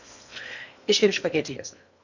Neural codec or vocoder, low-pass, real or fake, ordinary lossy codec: codec, 16 kHz in and 24 kHz out, 0.8 kbps, FocalCodec, streaming, 65536 codes; 7.2 kHz; fake; none